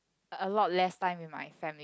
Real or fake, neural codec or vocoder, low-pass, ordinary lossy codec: real; none; none; none